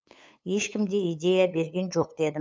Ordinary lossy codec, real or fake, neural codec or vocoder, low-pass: none; fake; codec, 16 kHz, 8 kbps, FunCodec, trained on LibriTTS, 25 frames a second; none